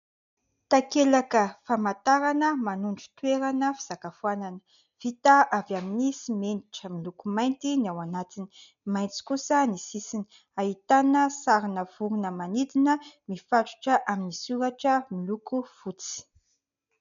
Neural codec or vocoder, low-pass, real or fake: none; 7.2 kHz; real